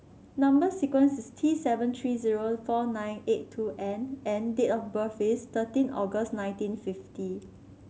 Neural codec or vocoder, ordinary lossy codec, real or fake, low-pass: none; none; real; none